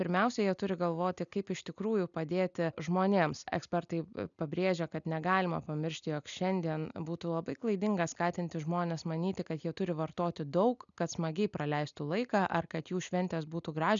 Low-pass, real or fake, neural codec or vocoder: 7.2 kHz; real; none